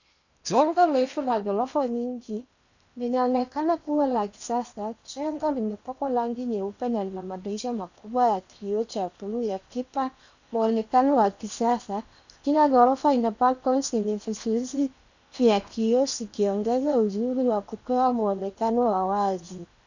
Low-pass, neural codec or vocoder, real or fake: 7.2 kHz; codec, 16 kHz in and 24 kHz out, 0.8 kbps, FocalCodec, streaming, 65536 codes; fake